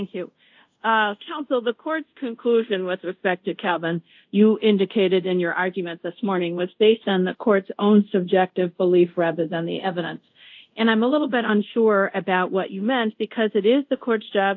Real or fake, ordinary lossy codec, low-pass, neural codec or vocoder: fake; AAC, 48 kbps; 7.2 kHz; codec, 24 kHz, 0.5 kbps, DualCodec